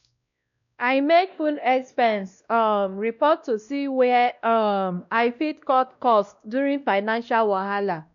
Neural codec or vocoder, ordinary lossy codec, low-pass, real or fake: codec, 16 kHz, 1 kbps, X-Codec, WavLM features, trained on Multilingual LibriSpeech; none; 7.2 kHz; fake